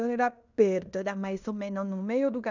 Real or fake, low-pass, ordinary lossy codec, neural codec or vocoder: fake; 7.2 kHz; none; codec, 16 kHz in and 24 kHz out, 0.9 kbps, LongCat-Audio-Codec, fine tuned four codebook decoder